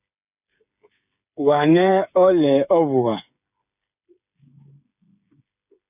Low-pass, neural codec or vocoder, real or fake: 3.6 kHz; codec, 16 kHz, 8 kbps, FreqCodec, smaller model; fake